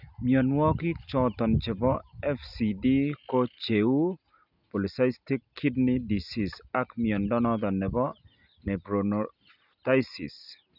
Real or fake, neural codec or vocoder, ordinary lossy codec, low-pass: real; none; none; 5.4 kHz